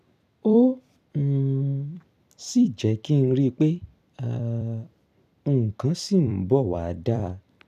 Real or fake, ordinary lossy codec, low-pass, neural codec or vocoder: fake; none; 14.4 kHz; vocoder, 44.1 kHz, 128 mel bands every 256 samples, BigVGAN v2